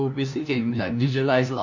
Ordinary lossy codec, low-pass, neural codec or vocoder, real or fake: none; 7.2 kHz; codec, 16 kHz, 1 kbps, FunCodec, trained on LibriTTS, 50 frames a second; fake